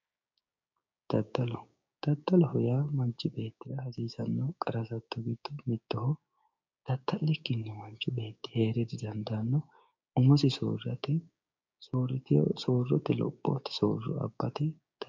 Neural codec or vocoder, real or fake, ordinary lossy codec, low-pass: codec, 16 kHz, 6 kbps, DAC; fake; MP3, 64 kbps; 7.2 kHz